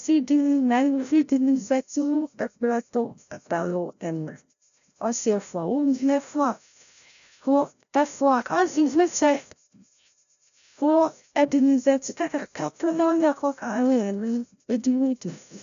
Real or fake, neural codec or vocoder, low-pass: fake; codec, 16 kHz, 0.5 kbps, FreqCodec, larger model; 7.2 kHz